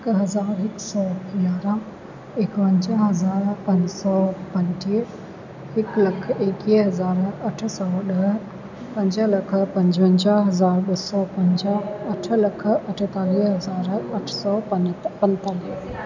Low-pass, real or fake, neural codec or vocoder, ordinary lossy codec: 7.2 kHz; real; none; none